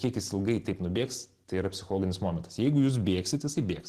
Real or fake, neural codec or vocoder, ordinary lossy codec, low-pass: real; none; Opus, 16 kbps; 14.4 kHz